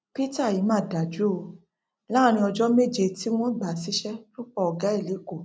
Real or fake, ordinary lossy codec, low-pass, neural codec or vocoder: real; none; none; none